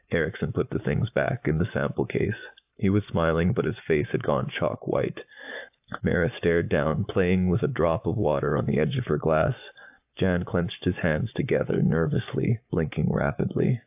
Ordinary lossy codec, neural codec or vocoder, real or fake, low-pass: AAC, 32 kbps; codec, 44.1 kHz, 7.8 kbps, Pupu-Codec; fake; 3.6 kHz